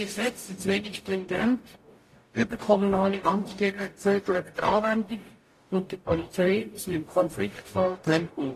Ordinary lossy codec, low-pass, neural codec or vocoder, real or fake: AAC, 48 kbps; 14.4 kHz; codec, 44.1 kHz, 0.9 kbps, DAC; fake